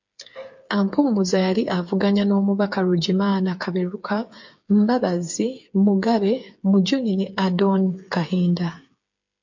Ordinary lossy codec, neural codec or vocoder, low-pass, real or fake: MP3, 48 kbps; codec, 16 kHz, 8 kbps, FreqCodec, smaller model; 7.2 kHz; fake